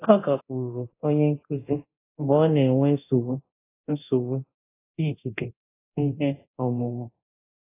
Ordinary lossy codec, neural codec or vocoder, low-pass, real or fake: AAC, 24 kbps; codec, 24 kHz, 0.9 kbps, DualCodec; 3.6 kHz; fake